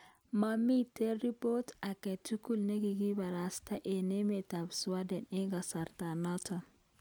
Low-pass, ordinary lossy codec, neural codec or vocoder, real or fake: none; none; none; real